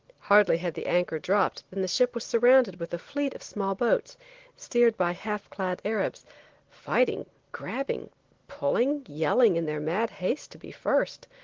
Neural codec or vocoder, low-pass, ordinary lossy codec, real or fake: none; 7.2 kHz; Opus, 16 kbps; real